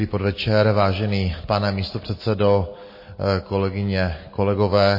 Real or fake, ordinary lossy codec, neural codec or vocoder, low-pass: real; MP3, 24 kbps; none; 5.4 kHz